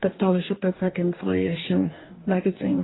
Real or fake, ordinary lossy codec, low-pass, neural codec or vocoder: fake; AAC, 16 kbps; 7.2 kHz; codec, 44.1 kHz, 2.6 kbps, DAC